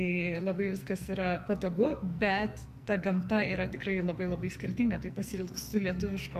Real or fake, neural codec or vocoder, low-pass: fake; codec, 44.1 kHz, 2.6 kbps, SNAC; 14.4 kHz